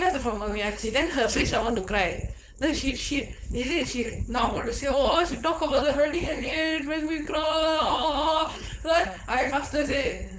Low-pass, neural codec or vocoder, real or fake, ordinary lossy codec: none; codec, 16 kHz, 4.8 kbps, FACodec; fake; none